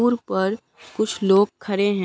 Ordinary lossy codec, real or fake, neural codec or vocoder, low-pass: none; real; none; none